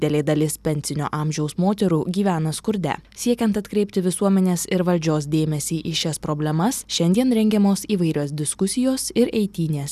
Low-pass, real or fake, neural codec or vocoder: 14.4 kHz; real; none